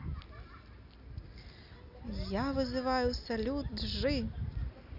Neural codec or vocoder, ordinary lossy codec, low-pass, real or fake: none; none; 5.4 kHz; real